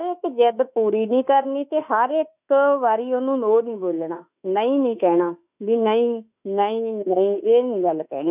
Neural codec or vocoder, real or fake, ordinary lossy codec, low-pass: autoencoder, 48 kHz, 32 numbers a frame, DAC-VAE, trained on Japanese speech; fake; none; 3.6 kHz